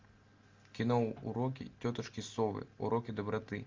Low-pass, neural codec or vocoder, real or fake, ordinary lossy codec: 7.2 kHz; none; real; Opus, 32 kbps